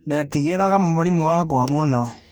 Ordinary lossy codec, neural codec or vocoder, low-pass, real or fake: none; codec, 44.1 kHz, 2.6 kbps, DAC; none; fake